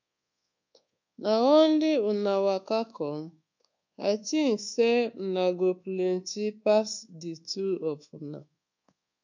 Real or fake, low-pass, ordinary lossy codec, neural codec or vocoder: fake; 7.2 kHz; MP3, 64 kbps; codec, 24 kHz, 1.2 kbps, DualCodec